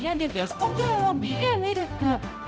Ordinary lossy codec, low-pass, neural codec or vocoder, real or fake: none; none; codec, 16 kHz, 0.5 kbps, X-Codec, HuBERT features, trained on balanced general audio; fake